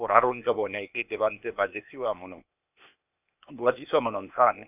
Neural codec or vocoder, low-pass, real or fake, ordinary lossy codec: codec, 16 kHz, 0.8 kbps, ZipCodec; 3.6 kHz; fake; none